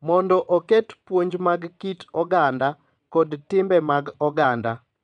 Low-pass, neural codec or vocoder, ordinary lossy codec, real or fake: 9.9 kHz; vocoder, 22.05 kHz, 80 mel bands, WaveNeXt; none; fake